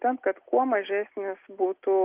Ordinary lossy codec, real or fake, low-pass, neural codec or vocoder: Opus, 32 kbps; real; 3.6 kHz; none